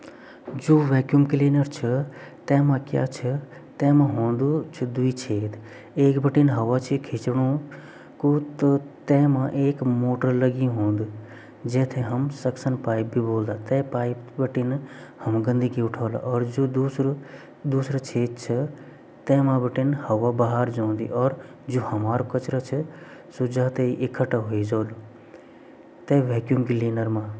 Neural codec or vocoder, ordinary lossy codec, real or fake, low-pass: none; none; real; none